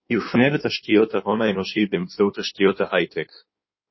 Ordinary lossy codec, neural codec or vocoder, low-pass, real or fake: MP3, 24 kbps; codec, 16 kHz in and 24 kHz out, 1.1 kbps, FireRedTTS-2 codec; 7.2 kHz; fake